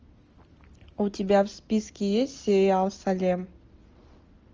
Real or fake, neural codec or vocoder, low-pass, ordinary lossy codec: real; none; 7.2 kHz; Opus, 24 kbps